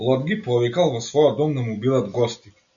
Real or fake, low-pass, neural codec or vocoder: real; 7.2 kHz; none